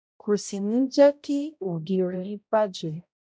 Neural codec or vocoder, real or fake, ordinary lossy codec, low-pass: codec, 16 kHz, 0.5 kbps, X-Codec, HuBERT features, trained on balanced general audio; fake; none; none